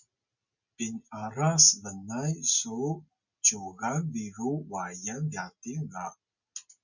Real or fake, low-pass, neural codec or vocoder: real; 7.2 kHz; none